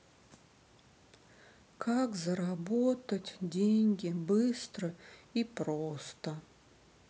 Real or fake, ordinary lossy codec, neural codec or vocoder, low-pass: real; none; none; none